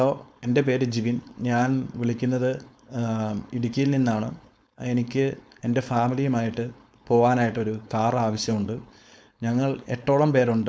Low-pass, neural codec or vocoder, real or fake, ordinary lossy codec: none; codec, 16 kHz, 4.8 kbps, FACodec; fake; none